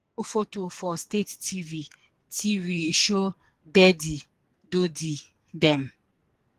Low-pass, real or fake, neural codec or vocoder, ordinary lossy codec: 14.4 kHz; fake; codec, 44.1 kHz, 2.6 kbps, SNAC; Opus, 24 kbps